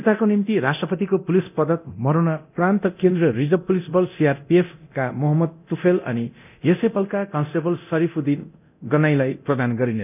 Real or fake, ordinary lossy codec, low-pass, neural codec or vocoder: fake; none; 3.6 kHz; codec, 24 kHz, 0.9 kbps, DualCodec